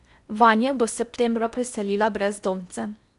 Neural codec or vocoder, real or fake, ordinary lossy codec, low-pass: codec, 16 kHz in and 24 kHz out, 0.6 kbps, FocalCodec, streaming, 4096 codes; fake; none; 10.8 kHz